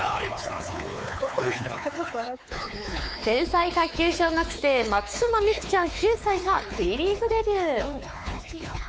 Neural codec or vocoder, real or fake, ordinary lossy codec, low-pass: codec, 16 kHz, 4 kbps, X-Codec, WavLM features, trained on Multilingual LibriSpeech; fake; none; none